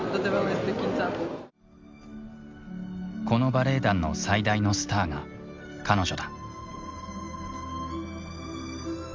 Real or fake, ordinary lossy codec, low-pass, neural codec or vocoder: real; Opus, 32 kbps; 7.2 kHz; none